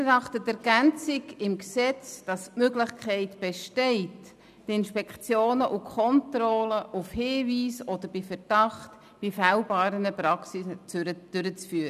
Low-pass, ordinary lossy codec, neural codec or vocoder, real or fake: 14.4 kHz; none; none; real